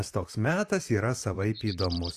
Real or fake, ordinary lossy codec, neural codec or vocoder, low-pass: fake; Opus, 64 kbps; vocoder, 44.1 kHz, 128 mel bands, Pupu-Vocoder; 14.4 kHz